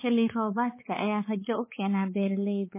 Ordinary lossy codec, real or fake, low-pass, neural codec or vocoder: MP3, 16 kbps; fake; 3.6 kHz; codec, 16 kHz, 4 kbps, X-Codec, HuBERT features, trained on balanced general audio